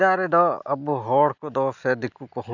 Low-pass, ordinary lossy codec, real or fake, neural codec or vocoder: 7.2 kHz; none; real; none